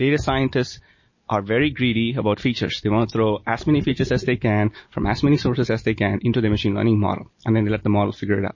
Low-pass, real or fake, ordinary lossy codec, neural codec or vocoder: 7.2 kHz; real; MP3, 32 kbps; none